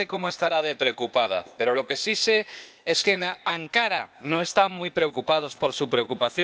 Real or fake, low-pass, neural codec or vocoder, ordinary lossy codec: fake; none; codec, 16 kHz, 0.8 kbps, ZipCodec; none